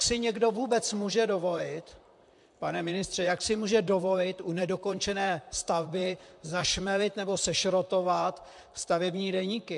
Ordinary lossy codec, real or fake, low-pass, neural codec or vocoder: MP3, 64 kbps; fake; 10.8 kHz; vocoder, 44.1 kHz, 128 mel bands, Pupu-Vocoder